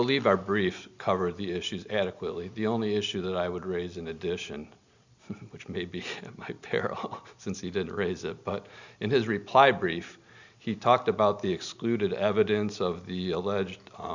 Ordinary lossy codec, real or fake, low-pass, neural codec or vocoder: Opus, 64 kbps; real; 7.2 kHz; none